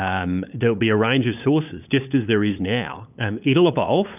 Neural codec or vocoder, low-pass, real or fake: codec, 16 kHz, 8 kbps, FunCodec, trained on LibriTTS, 25 frames a second; 3.6 kHz; fake